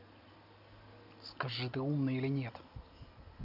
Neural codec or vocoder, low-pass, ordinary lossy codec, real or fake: none; 5.4 kHz; none; real